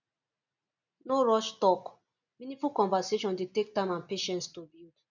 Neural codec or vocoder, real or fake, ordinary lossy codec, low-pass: none; real; none; 7.2 kHz